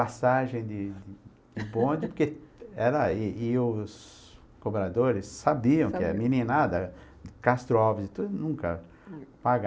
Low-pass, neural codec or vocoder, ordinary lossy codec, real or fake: none; none; none; real